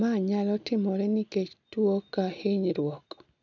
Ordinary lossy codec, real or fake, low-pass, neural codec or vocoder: none; real; 7.2 kHz; none